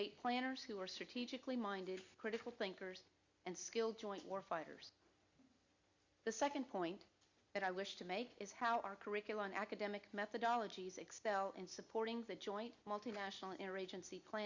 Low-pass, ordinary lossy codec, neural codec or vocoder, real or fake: 7.2 kHz; Opus, 64 kbps; none; real